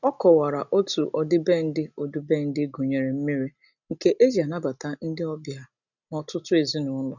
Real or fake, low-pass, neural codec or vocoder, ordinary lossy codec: real; 7.2 kHz; none; none